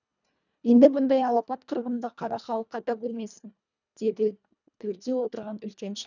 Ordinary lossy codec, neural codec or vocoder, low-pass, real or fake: none; codec, 24 kHz, 1.5 kbps, HILCodec; 7.2 kHz; fake